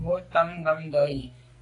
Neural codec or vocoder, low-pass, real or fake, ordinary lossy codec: codec, 44.1 kHz, 2.6 kbps, SNAC; 10.8 kHz; fake; AAC, 48 kbps